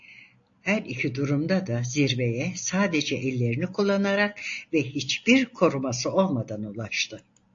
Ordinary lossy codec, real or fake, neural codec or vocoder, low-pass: AAC, 48 kbps; real; none; 7.2 kHz